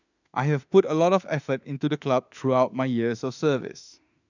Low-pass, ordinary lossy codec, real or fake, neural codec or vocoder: 7.2 kHz; none; fake; autoencoder, 48 kHz, 32 numbers a frame, DAC-VAE, trained on Japanese speech